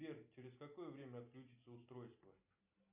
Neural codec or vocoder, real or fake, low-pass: none; real; 3.6 kHz